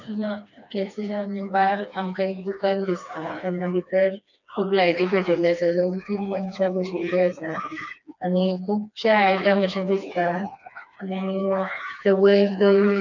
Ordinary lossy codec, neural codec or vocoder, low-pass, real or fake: none; codec, 16 kHz, 2 kbps, FreqCodec, smaller model; 7.2 kHz; fake